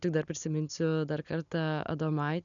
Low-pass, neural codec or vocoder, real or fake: 7.2 kHz; none; real